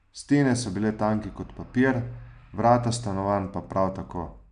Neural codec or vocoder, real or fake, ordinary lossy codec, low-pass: none; real; AAC, 96 kbps; 9.9 kHz